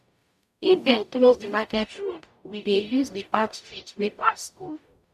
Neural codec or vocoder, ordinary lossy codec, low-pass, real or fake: codec, 44.1 kHz, 0.9 kbps, DAC; none; 14.4 kHz; fake